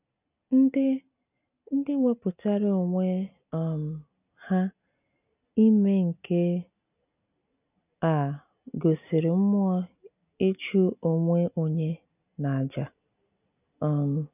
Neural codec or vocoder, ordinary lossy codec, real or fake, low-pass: none; none; real; 3.6 kHz